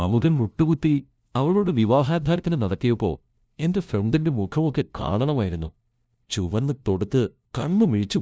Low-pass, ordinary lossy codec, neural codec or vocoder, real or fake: none; none; codec, 16 kHz, 0.5 kbps, FunCodec, trained on LibriTTS, 25 frames a second; fake